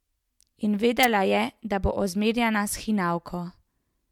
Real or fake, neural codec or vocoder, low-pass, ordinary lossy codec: real; none; 19.8 kHz; MP3, 96 kbps